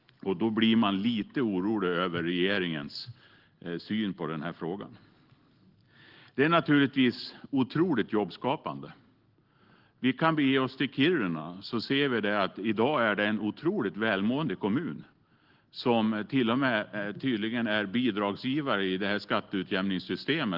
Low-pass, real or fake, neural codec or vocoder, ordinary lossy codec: 5.4 kHz; real; none; Opus, 16 kbps